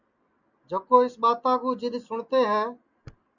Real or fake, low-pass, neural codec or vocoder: real; 7.2 kHz; none